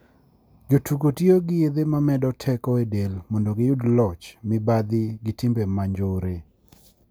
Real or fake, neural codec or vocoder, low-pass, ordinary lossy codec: real; none; none; none